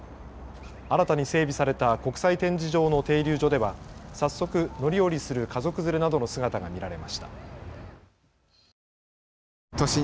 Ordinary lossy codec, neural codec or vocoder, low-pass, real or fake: none; none; none; real